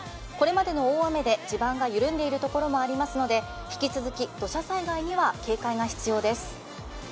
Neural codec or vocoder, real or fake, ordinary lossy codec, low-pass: none; real; none; none